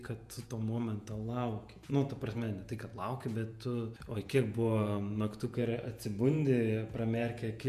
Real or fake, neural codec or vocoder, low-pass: fake; vocoder, 48 kHz, 128 mel bands, Vocos; 14.4 kHz